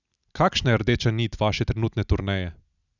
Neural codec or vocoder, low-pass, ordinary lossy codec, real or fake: none; 7.2 kHz; none; real